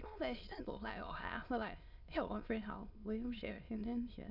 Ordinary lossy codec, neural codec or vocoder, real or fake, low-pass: none; autoencoder, 22.05 kHz, a latent of 192 numbers a frame, VITS, trained on many speakers; fake; 5.4 kHz